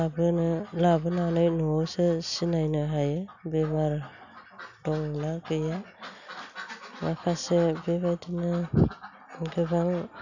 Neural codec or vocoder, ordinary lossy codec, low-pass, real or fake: none; none; 7.2 kHz; real